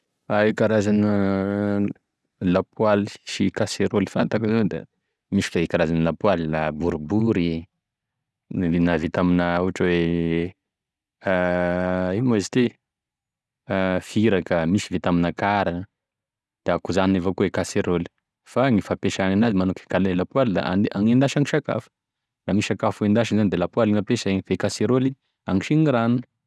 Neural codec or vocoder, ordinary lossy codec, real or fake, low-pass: none; none; real; none